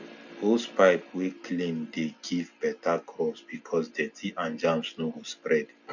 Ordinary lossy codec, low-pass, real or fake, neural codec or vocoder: none; 7.2 kHz; real; none